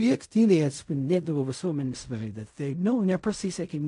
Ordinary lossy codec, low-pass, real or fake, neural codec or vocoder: AAC, 64 kbps; 10.8 kHz; fake; codec, 16 kHz in and 24 kHz out, 0.4 kbps, LongCat-Audio-Codec, fine tuned four codebook decoder